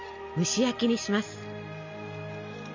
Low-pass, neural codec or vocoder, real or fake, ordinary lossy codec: 7.2 kHz; none; real; MP3, 64 kbps